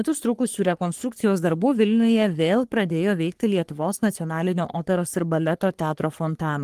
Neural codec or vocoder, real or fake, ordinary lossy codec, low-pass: codec, 44.1 kHz, 3.4 kbps, Pupu-Codec; fake; Opus, 24 kbps; 14.4 kHz